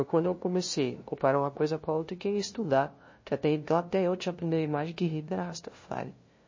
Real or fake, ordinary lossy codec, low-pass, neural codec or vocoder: fake; MP3, 32 kbps; 7.2 kHz; codec, 16 kHz, 0.5 kbps, FunCodec, trained on LibriTTS, 25 frames a second